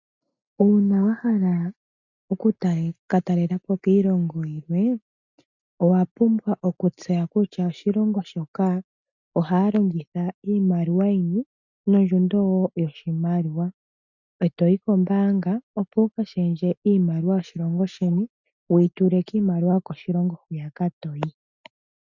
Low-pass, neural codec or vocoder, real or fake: 7.2 kHz; none; real